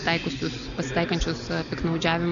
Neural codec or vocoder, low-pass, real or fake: none; 7.2 kHz; real